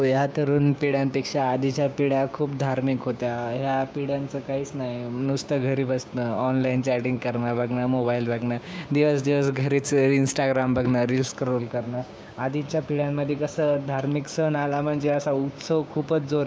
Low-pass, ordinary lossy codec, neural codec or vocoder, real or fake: none; none; codec, 16 kHz, 6 kbps, DAC; fake